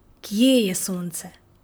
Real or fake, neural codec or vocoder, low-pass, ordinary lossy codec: fake; vocoder, 44.1 kHz, 128 mel bands, Pupu-Vocoder; none; none